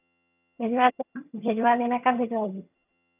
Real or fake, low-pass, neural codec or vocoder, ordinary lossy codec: fake; 3.6 kHz; vocoder, 22.05 kHz, 80 mel bands, HiFi-GAN; none